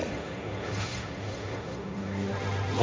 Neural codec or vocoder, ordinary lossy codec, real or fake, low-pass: codec, 16 kHz, 1.1 kbps, Voila-Tokenizer; none; fake; none